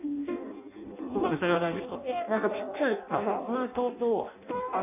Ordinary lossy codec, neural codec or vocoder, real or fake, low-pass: none; codec, 16 kHz in and 24 kHz out, 0.6 kbps, FireRedTTS-2 codec; fake; 3.6 kHz